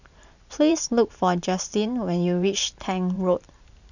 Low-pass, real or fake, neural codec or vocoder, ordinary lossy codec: 7.2 kHz; fake; vocoder, 44.1 kHz, 128 mel bands every 512 samples, BigVGAN v2; none